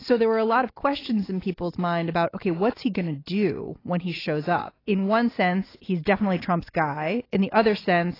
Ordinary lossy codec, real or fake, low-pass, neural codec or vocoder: AAC, 24 kbps; real; 5.4 kHz; none